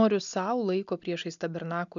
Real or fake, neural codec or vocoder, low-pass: real; none; 7.2 kHz